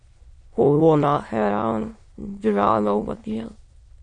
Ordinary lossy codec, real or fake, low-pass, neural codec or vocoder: MP3, 48 kbps; fake; 9.9 kHz; autoencoder, 22.05 kHz, a latent of 192 numbers a frame, VITS, trained on many speakers